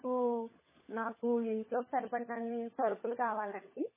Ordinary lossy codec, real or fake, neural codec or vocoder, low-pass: MP3, 16 kbps; fake; codec, 16 kHz, 16 kbps, FunCodec, trained on LibriTTS, 50 frames a second; 3.6 kHz